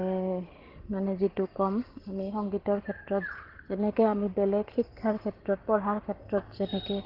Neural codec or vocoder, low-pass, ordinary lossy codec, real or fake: none; 5.4 kHz; Opus, 16 kbps; real